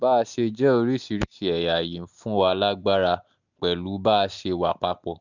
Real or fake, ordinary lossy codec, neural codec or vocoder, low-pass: real; none; none; 7.2 kHz